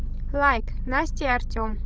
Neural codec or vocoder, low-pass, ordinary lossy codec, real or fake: codec, 16 kHz, 16 kbps, FreqCodec, larger model; none; none; fake